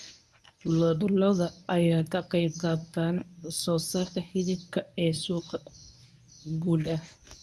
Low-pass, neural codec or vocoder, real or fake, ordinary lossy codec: none; codec, 24 kHz, 0.9 kbps, WavTokenizer, medium speech release version 1; fake; none